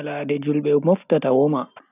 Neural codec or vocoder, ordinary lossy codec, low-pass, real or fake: none; none; 3.6 kHz; real